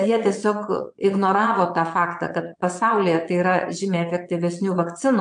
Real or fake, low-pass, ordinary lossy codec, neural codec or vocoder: fake; 9.9 kHz; MP3, 64 kbps; vocoder, 22.05 kHz, 80 mel bands, Vocos